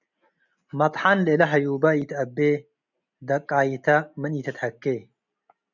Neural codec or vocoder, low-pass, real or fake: none; 7.2 kHz; real